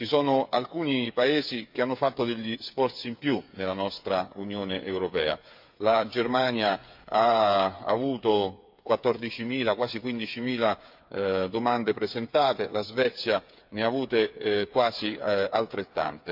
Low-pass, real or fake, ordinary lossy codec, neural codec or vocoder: 5.4 kHz; fake; MP3, 48 kbps; codec, 16 kHz, 8 kbps, FreqCodec, smaller model